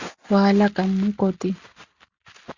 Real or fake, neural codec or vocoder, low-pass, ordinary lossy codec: real; none; 7.2 kHz; Opus, 64 kbps